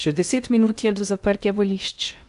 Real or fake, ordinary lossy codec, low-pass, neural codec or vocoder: fake; AAC, 96 kbps; 10.8 kHz; codec, 16 kHz in and 24 kHz out, 0.6 kbps, FocalCodec, streaming, 2048 codes